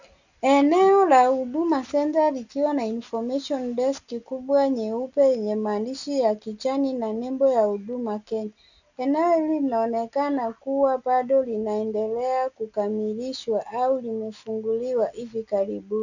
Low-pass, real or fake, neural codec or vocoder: 7.2 kHz; real; none